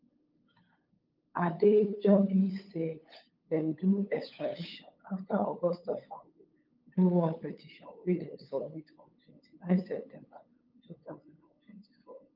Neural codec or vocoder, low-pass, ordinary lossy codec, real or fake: codec, 16 kHz, 8 kbps, FunCodec, trained on LibriTTS, 25 frames a second; 5.4 kHz; Opus, 24 kbps; fake